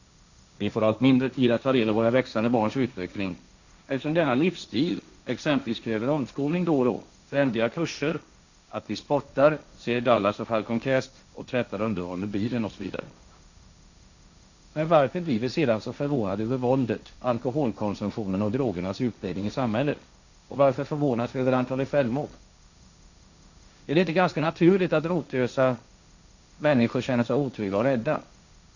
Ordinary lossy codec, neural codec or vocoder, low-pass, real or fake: none; codec, 16 kHz, 1.1 kbps, Voila-Tokenizer; 7.2 kHz; fake